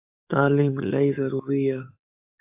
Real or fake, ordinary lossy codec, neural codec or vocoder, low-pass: fake; AAC, 32 kbps; codec, 44.1 kHz, 7.8 kbps, DAC; 3.6 kHz